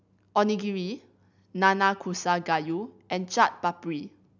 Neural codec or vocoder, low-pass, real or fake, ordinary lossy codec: none; 7.2 kHz; real; none